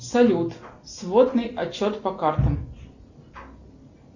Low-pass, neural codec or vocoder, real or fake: 7.2 kHz; none; real